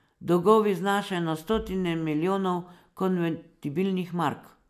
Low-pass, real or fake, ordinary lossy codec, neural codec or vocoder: 14.4 kHz; real; none; none